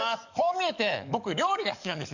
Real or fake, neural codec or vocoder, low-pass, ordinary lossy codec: fake; codec, 44.1 kHz, 7.8 kbps, DAC; 7.2 kHz; none